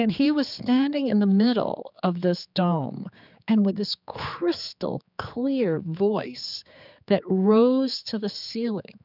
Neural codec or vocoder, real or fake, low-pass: codec, 16 kHz, 4 kbps, X-Codec, HuBERT features, trained on general audio; fake; 5.4 kHz